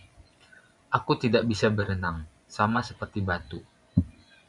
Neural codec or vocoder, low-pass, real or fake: vocoder, 24 kHz, 100 mel bands, Vocos; 10.8 kHz; fake